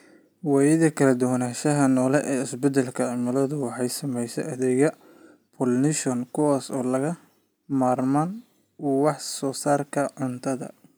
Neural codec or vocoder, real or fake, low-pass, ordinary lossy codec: none; real; none; none